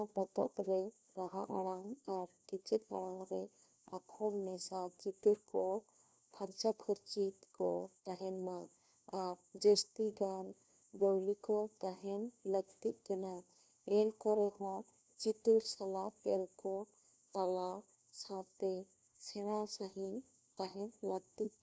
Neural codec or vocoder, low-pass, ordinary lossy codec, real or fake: codec, 16 kHz, 1 kbps, FunCodec, trained on LibriTTS, 50 frames a second; none; none; fake